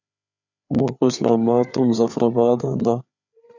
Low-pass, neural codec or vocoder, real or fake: 7.2 kHz; codec, 16 kHz, 4 kbps, FreqCodec, larger model; fake